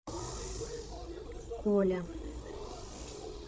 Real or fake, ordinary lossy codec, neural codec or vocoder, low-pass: fake; none; codec, 16 kHz, 16 kbps, FreqCodec, larger model; none